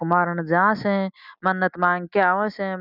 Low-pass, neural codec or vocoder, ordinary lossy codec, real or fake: 5.4 kHz; none; none; real